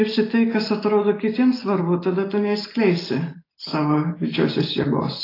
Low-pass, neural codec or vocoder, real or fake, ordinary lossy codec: 5.4 kHz; none; real; AAC, 24 kbps